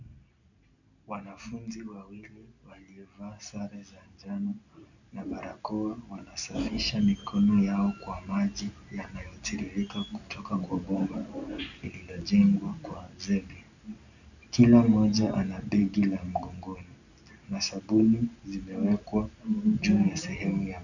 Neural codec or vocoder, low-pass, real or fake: none; 7.2 kHz; real